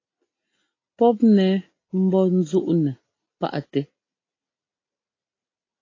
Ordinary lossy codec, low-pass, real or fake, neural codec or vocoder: AAC, 32 kbps; 7.2 kHz; real; none